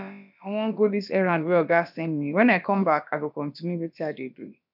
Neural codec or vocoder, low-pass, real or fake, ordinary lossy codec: codec, 16 kHz, about 1 kbps, DyCAST, with the encoder's durations; 5.4 kHz; fake; none